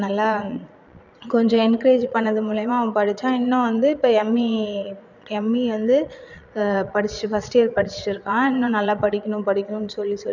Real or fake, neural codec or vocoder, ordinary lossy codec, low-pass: fake; vocoder, 22.05 kHz, 80 mel bands, Vocos; none; 7.2 kHz